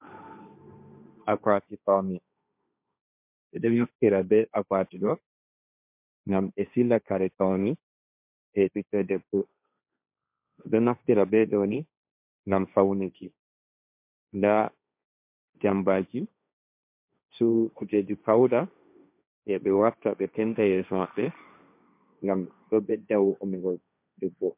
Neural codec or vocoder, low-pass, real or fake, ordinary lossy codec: codec, 16 kHz, 1.1 kbps, Voila-Tokenizer; 3.6 kHz; fake; MP3, 32 kbps